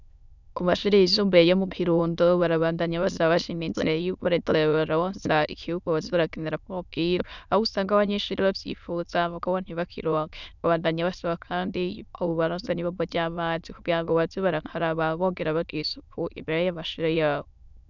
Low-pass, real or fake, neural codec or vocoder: 7.2 kHz; fake; autoencoder, 22.05 kHz, a latent of 192 numbers a frame, VITS, trained on many speakers